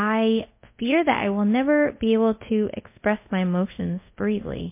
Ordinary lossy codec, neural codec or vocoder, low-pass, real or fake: MP3, 24 kbps; codec, 24 kHz, 0.5 kbps, DualCodec; 3.6 kHz; fake